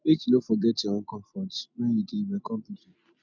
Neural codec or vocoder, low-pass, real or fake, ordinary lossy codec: none; 7.2 kHz; real; none